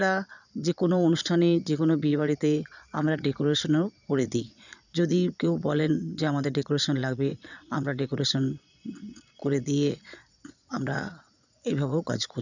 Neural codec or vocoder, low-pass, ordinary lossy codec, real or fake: vocoder, 22.05 kHz, 80 mel bands, Vocos; 7.2 kHz; none; fake